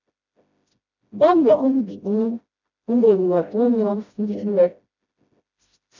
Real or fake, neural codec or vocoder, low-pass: fake; codec, 16 kHz, 0.5 kbps, FreqCodec, smaller model; 7.2 kHz